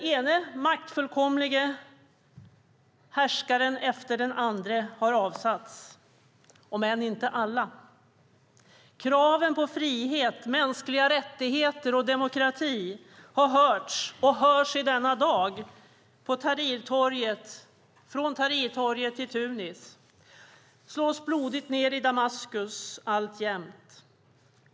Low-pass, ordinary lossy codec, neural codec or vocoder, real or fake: none; none; none; real